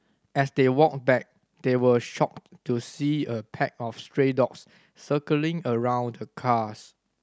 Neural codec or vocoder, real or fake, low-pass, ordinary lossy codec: none; real; none; none